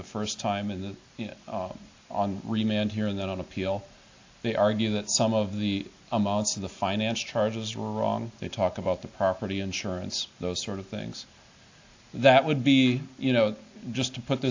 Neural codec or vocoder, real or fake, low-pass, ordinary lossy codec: none; real; 7.2 kHz; AAC, 48 kbps